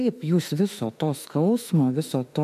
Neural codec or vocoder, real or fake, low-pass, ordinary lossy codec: autoencoder, 48 kHz, 32 numbers a frame, DAC-VAE, trained on Japanese speech; fake; 14.4 kHz; MP3, 96 kbps